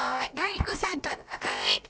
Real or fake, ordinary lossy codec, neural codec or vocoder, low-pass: fake; none; codec, 16 kHz, about 1 kbps, DyCAST, with the encoder's durations; none